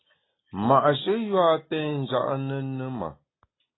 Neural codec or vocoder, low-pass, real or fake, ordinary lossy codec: none; 7.2 kHz; real; AAC, 16 kbps